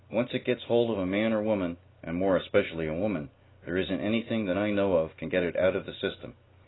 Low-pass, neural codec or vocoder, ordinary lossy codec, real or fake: 7.2 kHz; none; AAC, 16 kbps; real